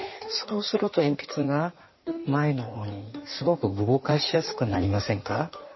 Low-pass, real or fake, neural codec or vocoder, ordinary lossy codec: 7.2 kHz; fake; codec, 16 kHz in and 24 kHz out, 1.1 kbps, FireRedTTS-2 codec; MP3, 24 kbps